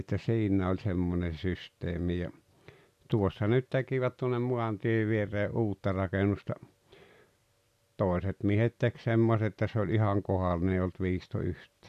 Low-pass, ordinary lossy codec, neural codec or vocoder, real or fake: 14.4 kHz; none; none; real